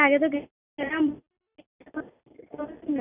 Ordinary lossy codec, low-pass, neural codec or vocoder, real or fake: none; 3.6 kHz; none; real